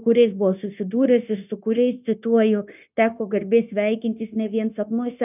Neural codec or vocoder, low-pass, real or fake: codec, 16 kHz, 0.9 kbps, LongCat-Audio-Codec; 3.6 kHz; fake